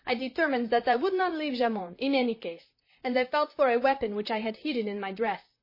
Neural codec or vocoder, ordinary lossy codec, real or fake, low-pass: vocoder, 44.1 kHz, 128 mel bands, Pupu-Vocoder; MP3, 24 kbps; fake; 5.4 kHz